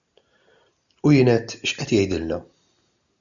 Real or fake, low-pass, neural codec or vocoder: real; 7.2 kHz; none